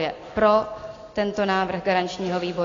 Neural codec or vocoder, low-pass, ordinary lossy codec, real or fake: none; 7.2 kHz; AAC, 32 kbps; real